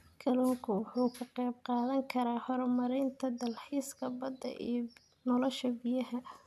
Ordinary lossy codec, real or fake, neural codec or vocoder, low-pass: none; real; none; 14.4 kHz